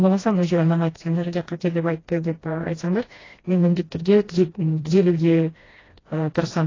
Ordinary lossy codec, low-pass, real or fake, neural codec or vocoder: AAC, 32 kbps; 7.2 kHz; fake; codec, 16 kHz, 1 kbps, FreqCodec, smaller model